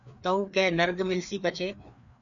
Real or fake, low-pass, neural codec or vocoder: fake; 7.2 kHz; codec, 16 kHz, 2 kbps, FreqCodec, larger model